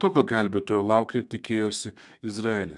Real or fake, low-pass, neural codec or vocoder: fake; 10.8 kHz; codec, 32 kHz, 1.9 kbps, SNAC